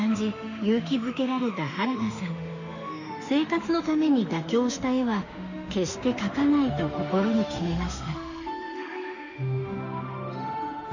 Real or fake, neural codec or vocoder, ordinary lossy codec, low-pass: fake; autoencoder, 48 kHz, 32 numbers a frame, DAC-VAE, trained on Japanese speech; none; 7.2 kHz